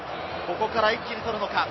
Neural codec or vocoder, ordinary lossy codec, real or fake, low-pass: none; MP3, 24 kbps; real; 7.2 kHz